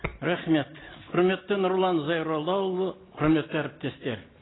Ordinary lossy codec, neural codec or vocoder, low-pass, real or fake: AAC, 16 kbps; none; 7.2 kHz; real